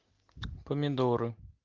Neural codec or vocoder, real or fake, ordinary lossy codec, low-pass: none; real; Opus, 32 kbps; 7.2 kHz